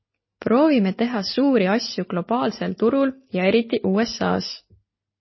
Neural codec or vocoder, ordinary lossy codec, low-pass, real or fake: none; MP3, 24 kbps; 7.2 kHz; real